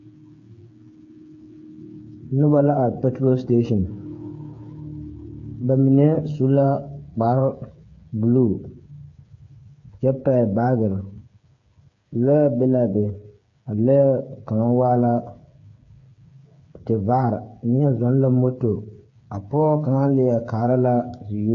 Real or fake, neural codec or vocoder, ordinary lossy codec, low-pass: fake; codec, 16 kHz, 8 kbps, FreqCodec, smaller model; AAC, 48 kbps; 7.2 kHz